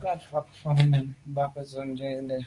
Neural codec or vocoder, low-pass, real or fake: codec, 24 kHz, 0.9 kbps, WavTokenizer, medium speech release version 2; 10.8 kHz; fake